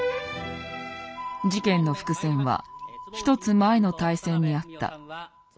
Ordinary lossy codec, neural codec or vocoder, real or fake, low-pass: none; none; real; none